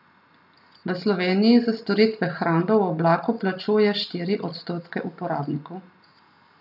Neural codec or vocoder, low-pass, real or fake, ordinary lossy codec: none; 5.4 kHz; real; none